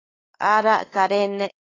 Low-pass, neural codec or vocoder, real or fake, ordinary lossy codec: 7.2 kHz; codec, 16 kHz, 2 kbps, X-Codec, WavLM features, trained on Multilingual LibriSpeech; fake; AAC, 32 kbps